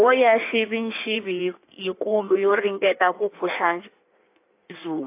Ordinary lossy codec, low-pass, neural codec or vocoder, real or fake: AAC, 24 kbps; 3.6 kHz; codec, 16 kHz in and 24 kHz out, 1.1 kbps, FireRedTTS-2 codec; fake